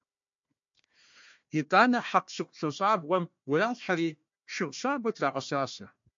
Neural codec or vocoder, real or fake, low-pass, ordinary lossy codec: codec, 16 kHz, 1 kbps, FunCodec, trained on Chinese and English, 50 frames a second; fake; 7.2 kHz; MP3, 48 kbps